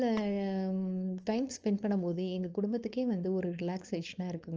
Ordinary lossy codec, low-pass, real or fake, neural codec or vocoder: Opus, 24 kbps; 7.2 kHz; fake; codec, 16 kHz, 4.8 kbps, FACodec